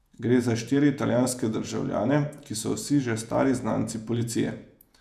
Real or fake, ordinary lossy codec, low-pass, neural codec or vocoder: fake; none; 14.4 kHz; vocoder, 48 kHz, 128 mel bands, Vocos